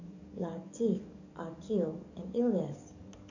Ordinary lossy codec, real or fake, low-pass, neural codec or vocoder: none; fake; 7.2 kHz; codec, 44.1 kHz, 7.8 kbps, DAC